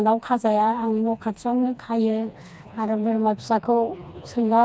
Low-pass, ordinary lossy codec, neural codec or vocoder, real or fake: none; none; codec, 16 kHz, 2 kbps, FreqCodec, smaller model; fake